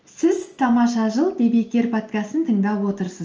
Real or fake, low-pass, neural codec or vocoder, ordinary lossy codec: real; 7.2 kHz; none; Opus, 24 kbps